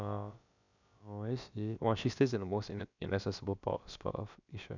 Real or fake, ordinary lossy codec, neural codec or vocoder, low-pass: fake; none; codec, 16 kHz, about 1 kbps, DyCAST, with the encoder's durations; 7.2 kHz